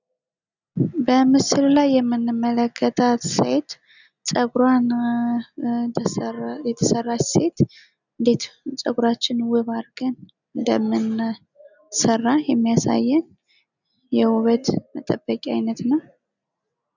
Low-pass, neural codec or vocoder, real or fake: 7.2 kHz; none; real